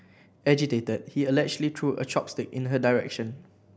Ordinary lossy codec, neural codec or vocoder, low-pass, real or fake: none; none; none; real